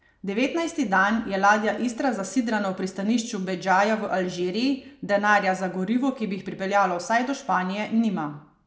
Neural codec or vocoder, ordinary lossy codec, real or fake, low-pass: none; none; real; none